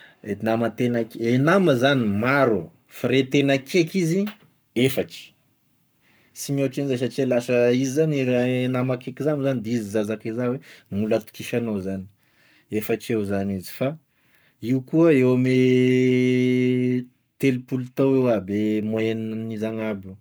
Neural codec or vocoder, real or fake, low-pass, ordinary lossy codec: codec, 44.1 kHz, 7.8 kbps, Pupu-Codec; fake; none; none